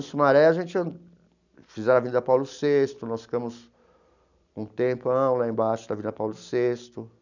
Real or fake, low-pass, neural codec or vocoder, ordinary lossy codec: real; 7.2 kHz; none; none